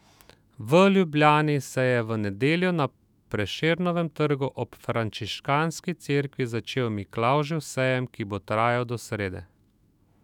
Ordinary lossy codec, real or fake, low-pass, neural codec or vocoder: none; fake; 19.8 kHz; autoencoder, 48 kHz, 128 numbers a frame, DAC-VAE, trained on Japanese speech